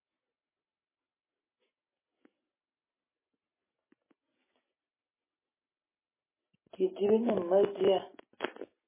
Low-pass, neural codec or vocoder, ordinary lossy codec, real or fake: 3.6 kHz; none; MP3, 16 kbps; real